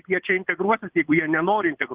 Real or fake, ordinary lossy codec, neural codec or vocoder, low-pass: fake; Opus, 16 kbps; vocoder, 22.05 kHz, 80 mel bands, Vocos; 3.6 kHz